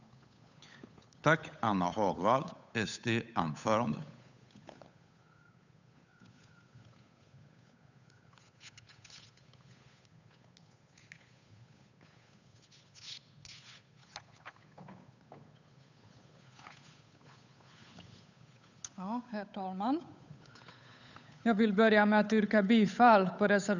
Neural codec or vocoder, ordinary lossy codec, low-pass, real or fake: codec, 16 kHz, 8 kbps, FunCodec, trained on Chinese and English, 25 frames a second; none; 7.2 kHz; fake